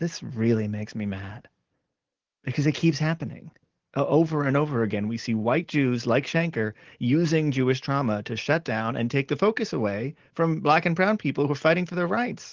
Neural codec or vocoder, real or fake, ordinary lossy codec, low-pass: none; real; Opus, 16 kbps; 7.2 kHz